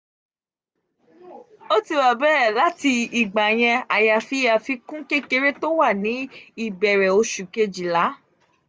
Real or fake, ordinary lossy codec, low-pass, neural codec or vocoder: real; none; none; none